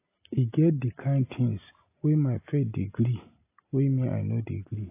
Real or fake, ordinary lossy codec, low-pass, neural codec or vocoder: real; AAC, 24 kbps; 3.6 kHz; none